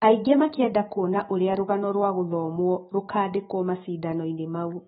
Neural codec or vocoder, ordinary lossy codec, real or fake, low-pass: autoencoder, 48 kHz, 128 numbers a frame, DAC-VAE, trained on Japanese speech; AAC, 16 kbps; fake; 19.8 kHz